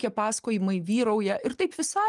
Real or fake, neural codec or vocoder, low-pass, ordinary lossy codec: real; none; 10.8 kHz; Opus, 32 kbps